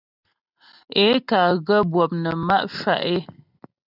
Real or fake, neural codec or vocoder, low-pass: real; none; 5.4 kHz